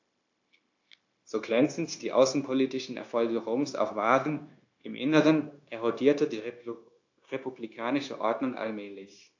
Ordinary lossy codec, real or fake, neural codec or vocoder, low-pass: AAC, 48 kbps; fake; codec, 16 kHz, 0.9 kbps, LongCat-Audio-Codec; 7.2 kHz